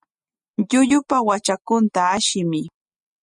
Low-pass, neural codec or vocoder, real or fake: 10.8 kHz; none; real